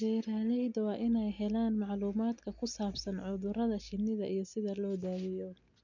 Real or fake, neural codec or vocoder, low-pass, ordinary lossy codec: real; none; 7.2 kHz; none